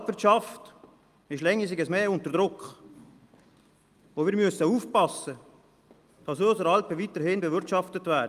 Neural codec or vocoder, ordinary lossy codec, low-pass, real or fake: none; Opus, 32 kbps; 14.4 kHz; real